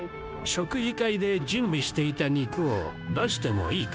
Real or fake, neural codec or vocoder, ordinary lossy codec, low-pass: fake; codec, 16 kHz, 0.9 kbps, LongCat-Audio-Codec; none; none